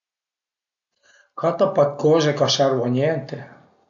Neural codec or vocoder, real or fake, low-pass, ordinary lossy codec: none; real; 7.2 kHz; AAC, 64 kbps